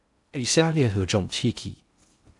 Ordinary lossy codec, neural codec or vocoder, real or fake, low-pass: AAC, 64 kbps; codec, 16 kHz in and 24 kHz out, 0.6 kbps, FocalCodec, streaming, 2048 codes; fake; 10.8 kHz